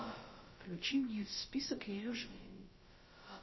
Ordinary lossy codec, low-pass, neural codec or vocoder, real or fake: MP3, 24 kbps; 7.2 kHz; codec, 16 kHz, about 1 kbps, DyCAST, with the encoder's durations; fake